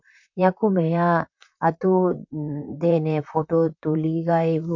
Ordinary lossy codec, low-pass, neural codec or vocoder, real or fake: none; 7.2 kHz; vocoder, 44.1 kHz, 128 mel bands, Pupu-Vocoder; fake